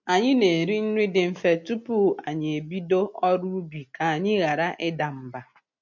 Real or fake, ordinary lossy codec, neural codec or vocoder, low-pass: real; MP3, 48 kbps; none; 7.2 kHz